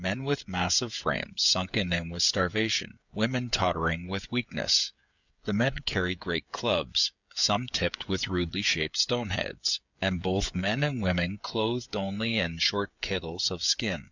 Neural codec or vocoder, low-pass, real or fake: codec, 16 kHz, 16 kbps, FreqCodec, smaller model; 7.2 kHz; fake